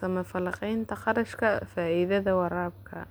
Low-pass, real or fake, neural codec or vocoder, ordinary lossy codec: none; real; none; none